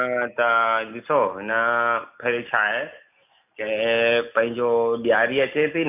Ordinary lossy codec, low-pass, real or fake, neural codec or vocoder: none; 3.6 kHz; real; none